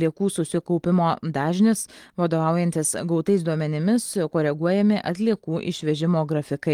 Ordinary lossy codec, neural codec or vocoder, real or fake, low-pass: Opus, 24 kbps; none; real; 19.8 kHz